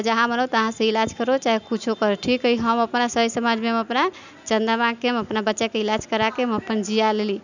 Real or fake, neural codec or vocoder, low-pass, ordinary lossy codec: real; none; 7.2 kHz; none